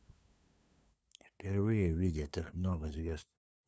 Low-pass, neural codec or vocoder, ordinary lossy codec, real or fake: none; codec, 16 kHz, 2 kbps, FunCodec, trained on LibriTTS, 25 frames a second; none; fake